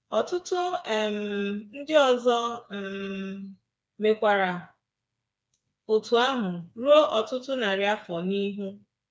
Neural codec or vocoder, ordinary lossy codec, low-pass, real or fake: codec, 16 kHz, 4 kbps, FreqCodec, smaller model; none; none; fake